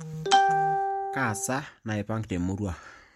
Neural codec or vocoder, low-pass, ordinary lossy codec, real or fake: none; 19.8 kHz; MP3, 64 kbps; real